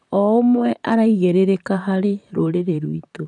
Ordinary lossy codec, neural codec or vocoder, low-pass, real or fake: none; vocoder, 44.1 kHz, 128 mel bands, Pupu-Vocoder; 10.8 kHz; fake